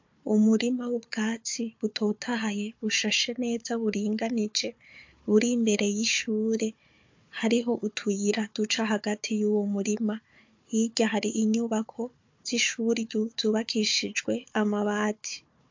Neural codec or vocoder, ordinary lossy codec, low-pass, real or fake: codec, 16 kHz, 4 kbps, FunCodec, trained on Chinese and English, 50 frames a second; MP3, 48 kbps; 7.2 kHz; fake